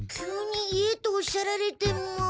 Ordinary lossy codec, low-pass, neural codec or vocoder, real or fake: none; none; none; real